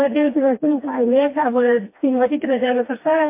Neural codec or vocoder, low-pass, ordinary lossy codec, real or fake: codec, 16 kHz, 2 kbps, FreqCodec, smaller model; 3.6 kHz; MP3, 24 kbps; fake